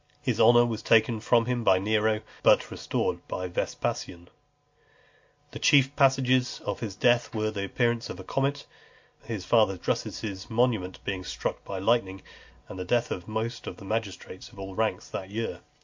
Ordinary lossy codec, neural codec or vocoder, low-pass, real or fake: MP3, 48 kbps; none; 7.2 kHz; real